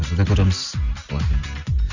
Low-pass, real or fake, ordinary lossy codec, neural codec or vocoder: 7.2 kHz; real; none; none